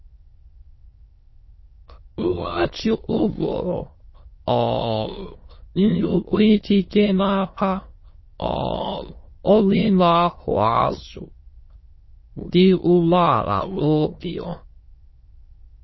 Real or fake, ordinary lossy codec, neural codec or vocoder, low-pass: fake; MP3, 24 kbps; autoencoder, 22.05 kHz, a latent of 192 numbers a frame, VITS, trained on many speakers; 7.2 kHz